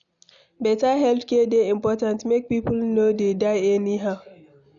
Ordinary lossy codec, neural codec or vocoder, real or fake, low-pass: none; none; real; 7.2 kHz